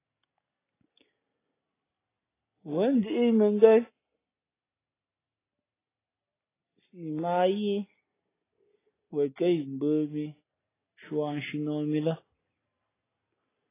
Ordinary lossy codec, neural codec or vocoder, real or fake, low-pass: AAC, 16 kbps; none; real; 3.6 kHz